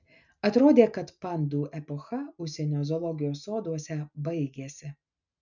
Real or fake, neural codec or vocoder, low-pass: real; none; 7.2 kHz